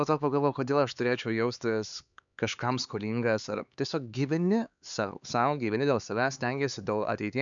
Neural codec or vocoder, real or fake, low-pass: codec, 16 kHz, 4 kbps, X-Codec, HuBERT features, trained on LibriSpeech; fake; 7.2 kHz